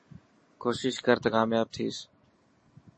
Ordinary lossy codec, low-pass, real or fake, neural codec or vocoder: MP3, 32 kbps; 9.9 kHz; real; none